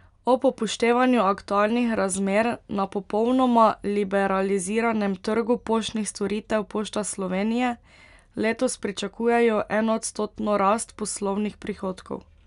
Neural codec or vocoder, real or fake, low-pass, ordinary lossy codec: none; real; 10.8 kHz; none